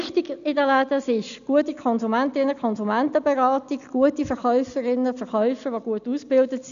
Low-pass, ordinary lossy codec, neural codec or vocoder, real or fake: 7.2 kHz; none; none; real